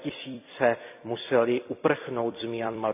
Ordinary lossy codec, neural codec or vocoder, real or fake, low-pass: none; vocoder, 44.1 kHz, 128 mel bands every 256 samples, BigVGAN v2; fake; 3.6 kHz